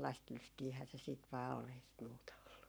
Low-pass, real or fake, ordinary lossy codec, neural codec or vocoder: none; fake; none; codec, 44.1 kHz, 7.8 kbps, Pupu-Codec